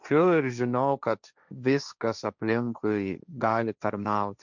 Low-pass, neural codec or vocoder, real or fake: 7.2 kHz; codec, 16 kHz, 1.1 kbps, Voila-Tokenizer; fake